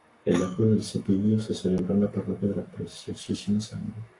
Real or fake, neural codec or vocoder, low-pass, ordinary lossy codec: fake; codec, 44.1 kHz, 7.8 kbps, Pupu-Codec; 10.8 kHz; AAC, 48 kbps